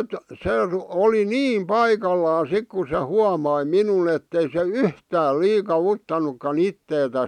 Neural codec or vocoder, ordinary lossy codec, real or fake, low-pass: none; none; real; 19.8 kHz